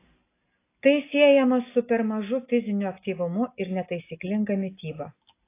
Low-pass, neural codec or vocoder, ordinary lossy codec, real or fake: 3.6 kHz; none; AAC, 24 kbps; real